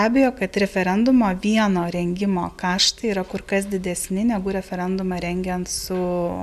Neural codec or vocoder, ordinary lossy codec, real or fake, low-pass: none; AAC, 96 kbps; real; 14.4 kHz